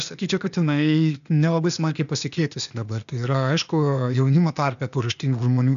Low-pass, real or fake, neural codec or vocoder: 7.2 kHz; fake; codec, 16 kHz, 0.8 kbps, ZipCodec